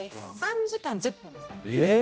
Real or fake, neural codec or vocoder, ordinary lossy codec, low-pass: fake; codec, 16 kHz, 0.5 kbps, X-Codec, HuBERT features, trained on general audio; none; none